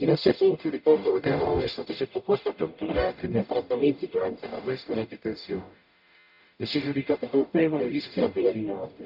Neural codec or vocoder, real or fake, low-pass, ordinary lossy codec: codec, 44.1 kHz, 0.9 kbps, DAC; fake; 5.4 kHz; none